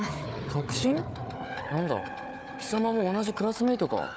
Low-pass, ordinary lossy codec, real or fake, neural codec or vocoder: none; none; fake; codec, 16 kHz, 4 kbps, FunCodec, trained on Chinese and English, 50 frames a second